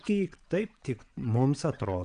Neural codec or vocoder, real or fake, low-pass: vocoder, 22.05 kHz, 80 mel bands, WaveNeXt; fake; 9.9 kHz